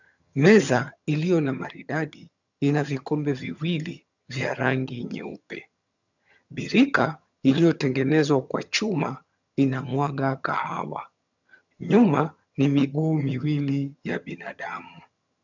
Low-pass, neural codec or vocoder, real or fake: 7.2 kHz; vocoder, 22.05 kHz, 80 mel bands, HiFi-GAN; fake